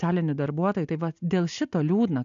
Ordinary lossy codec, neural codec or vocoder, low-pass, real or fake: MP3, 96 kbps; none; 7.2 kHz; real